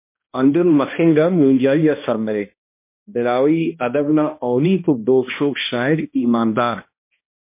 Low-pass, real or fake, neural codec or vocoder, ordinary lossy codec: 3.6 kHz; fake; codec, 16 kHz, 1 kbps, X-Codec, HuBERT features, trained on balanced general audio; MP3, 24 kbps